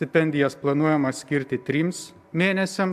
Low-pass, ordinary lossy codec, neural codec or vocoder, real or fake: 14.4 kHz; AAC, 96 kbps; none; real